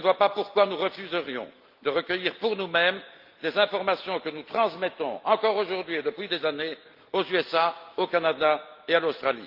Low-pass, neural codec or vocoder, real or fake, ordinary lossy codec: 5.4 kHz; none; real; Opus, 32 kbps